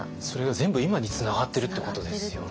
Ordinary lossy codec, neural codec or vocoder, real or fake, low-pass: none; none; real; none